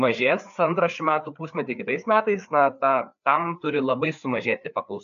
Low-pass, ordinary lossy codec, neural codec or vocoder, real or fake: 7.2 kHz; AAC, 96 kbps; codec, 16 kHz, 4 kbps, FreqCodec, larger model; fake